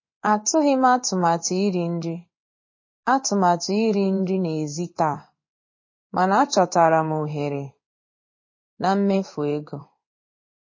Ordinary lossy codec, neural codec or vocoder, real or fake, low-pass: MP3, 32 kbps; codec, 16 kHz in and 24 kHz out, 1 kbps, XY-Tokenizer; fake; 7.2 kHz